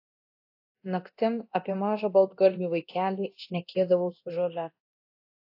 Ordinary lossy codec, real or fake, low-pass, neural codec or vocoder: AAC, 32 kbps; fake; 5.4 kHz; codec, 24 kHz, 0.9 kbps, DualCodec